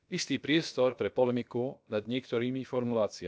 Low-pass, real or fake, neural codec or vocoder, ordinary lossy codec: none; fake; codec, 16 kHz, about 1 kbps, DyCAST, with the encoder's durations; none